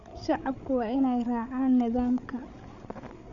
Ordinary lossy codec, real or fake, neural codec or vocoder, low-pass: none; fake; codec, 16 kHz, 8 kbps, FreqCodec, larger model; 7.2 kHz